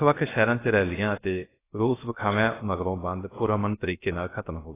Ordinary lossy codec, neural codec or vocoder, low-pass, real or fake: AAC, 16 kbps; codec, 16 kHz, 0.3 kbps, FocalCodec; 3.6 kHz; fake